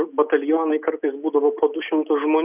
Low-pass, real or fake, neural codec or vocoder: 3.6 kHz; real; none